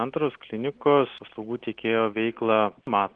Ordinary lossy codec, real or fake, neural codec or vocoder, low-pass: Opus, 16 kbps; real; none; 9.9 kHz